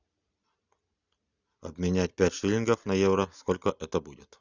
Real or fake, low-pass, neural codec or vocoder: real; 7.2 kHz; none